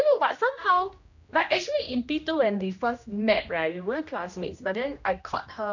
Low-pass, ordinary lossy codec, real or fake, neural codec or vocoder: 7.2 kHz; none; fake; codec, 16 kHz, 1 kbps, X-Codec, HuBERT features, trained on general audio